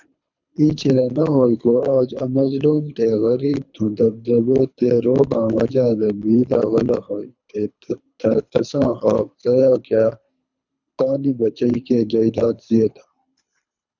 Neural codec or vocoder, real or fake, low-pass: codec, 24 kHz, 3 kbps, HILCodec; fake; 7.2 kHz